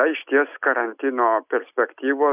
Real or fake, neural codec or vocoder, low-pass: real; none; 3.6 kHz